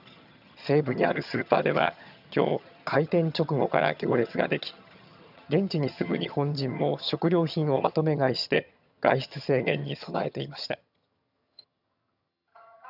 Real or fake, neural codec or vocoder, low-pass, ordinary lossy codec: fake; vocoder, 22.05 kHz, 80 mel bands, HiFi-GAN; 5.4 kHz; none